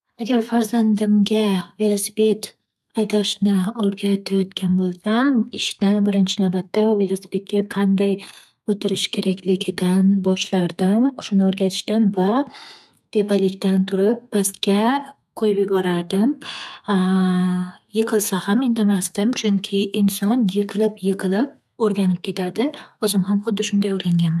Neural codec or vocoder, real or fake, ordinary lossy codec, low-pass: codec, 32 kHz, 1.9 kbps, SNAC; fake; none; 14.4 kHz